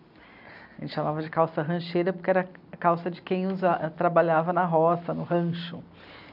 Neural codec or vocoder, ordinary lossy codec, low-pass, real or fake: none; none; 5.4 kHz; real